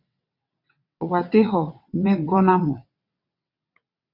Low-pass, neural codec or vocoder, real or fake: 5.4 kHz; vocoder, 22.05 kHz, 80 mel bands, WaveNeXt; fake